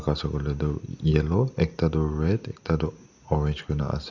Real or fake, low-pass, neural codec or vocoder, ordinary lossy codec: real; 7.2 kHz; none; none